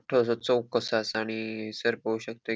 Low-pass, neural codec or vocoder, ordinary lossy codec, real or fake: none; none; none; real